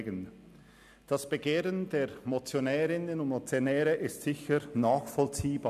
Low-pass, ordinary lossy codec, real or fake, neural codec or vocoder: 14.4 kHz; none; real; none